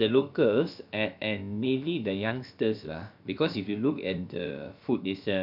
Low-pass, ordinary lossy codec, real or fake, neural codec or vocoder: 5.4 kHz; none; fake; codec, 16 kHz, about 1 kbps, DyCAST, with the encoder's durations